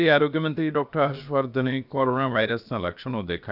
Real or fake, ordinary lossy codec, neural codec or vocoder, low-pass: fake; none; codec, 16 kHz, about 1 kbps, DyCAST, with the encoder's durations; 5.4 kHz